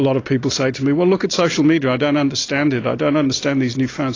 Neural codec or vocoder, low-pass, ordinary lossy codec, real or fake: none; 7.2 kHz; AAC, 32 kbps; real